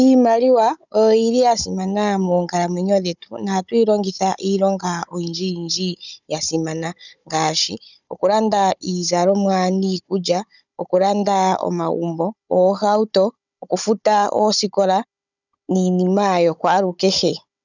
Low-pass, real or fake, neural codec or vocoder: 7.2 kHz; fake; codec, 16 kHz, 16 kbps, FunCodec, trained on Chinese and English, 50 frames a second